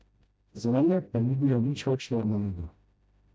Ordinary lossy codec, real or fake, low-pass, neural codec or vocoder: none; fake; none; codec, 16 kHz, 0.5 kbps, FreqCodec, smaller model